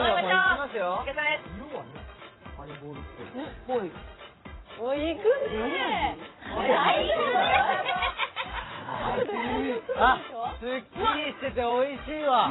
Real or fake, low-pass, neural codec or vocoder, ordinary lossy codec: fake; 7.2 kHz; vocoder, 44.1 kHz, 80 mel bands, Vocos; AAC, 16 kbps